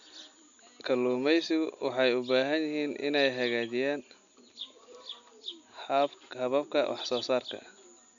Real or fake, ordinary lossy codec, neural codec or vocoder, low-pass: real; none; none; 7.2 kHz